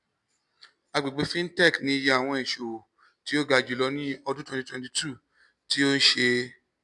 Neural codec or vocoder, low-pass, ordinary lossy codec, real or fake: none; 10.8 kHz; none; real